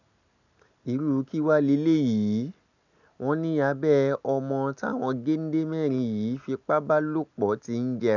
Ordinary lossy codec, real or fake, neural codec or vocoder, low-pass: none; real; none; 7.2 kHz